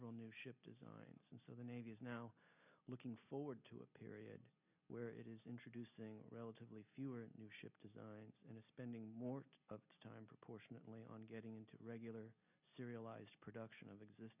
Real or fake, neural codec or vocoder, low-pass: real; none; 3.6 kHz